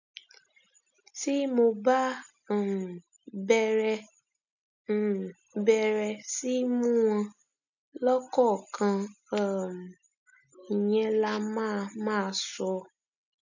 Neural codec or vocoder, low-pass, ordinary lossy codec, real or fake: none; 7.2 kHz; none; real